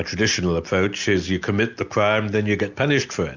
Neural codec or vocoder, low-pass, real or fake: none; 7.2 kHz; real